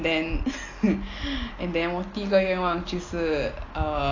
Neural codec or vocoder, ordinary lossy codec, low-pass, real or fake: none; AAC, 32 kbps; 7.2 kHz; real